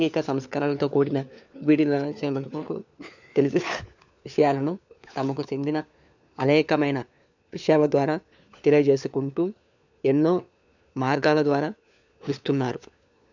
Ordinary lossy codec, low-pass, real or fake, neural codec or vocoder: none; 7.2 kHz; fake; codec, 16 kHz, 4 kbps, FunCodec, trained on LibriTTS, 50 frames a second